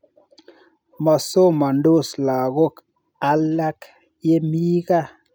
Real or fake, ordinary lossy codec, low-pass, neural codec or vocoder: real; none; none; none